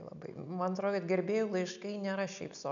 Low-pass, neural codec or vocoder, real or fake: 7.2 kHz; none; real